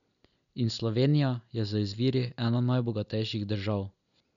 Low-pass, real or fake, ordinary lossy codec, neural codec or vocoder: 7.2 kHz; real; none; none